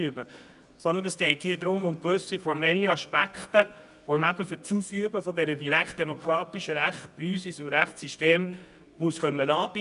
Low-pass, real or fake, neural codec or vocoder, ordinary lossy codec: 10.8 kHz; fake; codec, 24 kHz, 0.9 kbps, WavTokenizer, medium music audio release; none